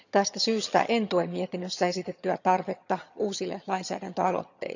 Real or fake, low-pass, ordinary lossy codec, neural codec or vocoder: fake; 7.2 kHz; none; vocoder, 22.05 kHz, 80 mel bands, HiFi-GAN